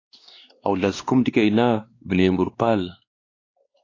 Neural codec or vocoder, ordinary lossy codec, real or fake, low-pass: codec, 16 kHz, 2 kbps, X-Codec, WavLM features, trained on Multilingual LibriSpeech; AAC, 32 kbps; fake; 7.2 kHz